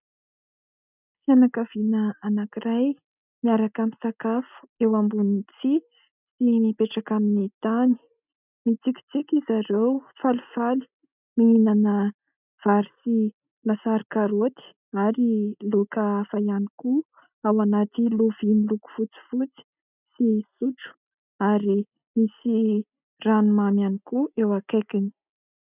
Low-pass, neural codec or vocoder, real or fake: 3.6 kHz; none; real